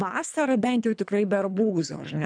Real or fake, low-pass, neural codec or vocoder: fake; 9.9 kHz; codec, 24 kHz, 3 kbps, HILCodec